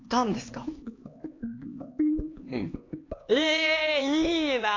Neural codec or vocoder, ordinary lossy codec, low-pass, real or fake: codec, 16 kHz, 2 kbps, X-Codec, HuBERT features, trained on LibriSpeech; AAC, 32 kbps; 7.2 kHz; fake